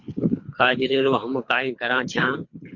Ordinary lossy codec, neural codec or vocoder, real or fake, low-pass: MP3, 48 kbps; codec, 24 kHz, 3 kbps, HILCodec; fake; 7.2 kHz